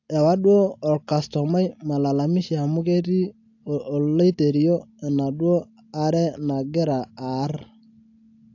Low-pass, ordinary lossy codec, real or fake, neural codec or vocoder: 7.2 kHz; AAC, 48 kbps; real; none